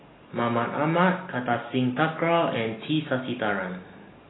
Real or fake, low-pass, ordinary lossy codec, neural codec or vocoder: real; 7.2 kHz; AAC, 16 kbps; none